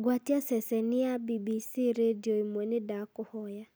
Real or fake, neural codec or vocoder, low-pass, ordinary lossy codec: real; none; none; none